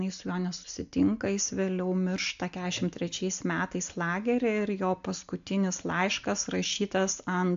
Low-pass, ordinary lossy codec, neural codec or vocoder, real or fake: 7.2 kHz; AAC, 64 kbps; none; real